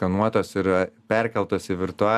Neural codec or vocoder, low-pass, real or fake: none; 14.4 kHz; real